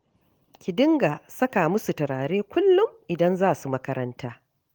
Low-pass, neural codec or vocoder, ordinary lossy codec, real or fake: 19.8 kHz; none; Opus, 24 kbps; real